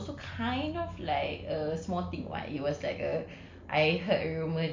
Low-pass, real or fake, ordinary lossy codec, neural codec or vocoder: 7.2 kHz; real; MP3, 48 kbps; none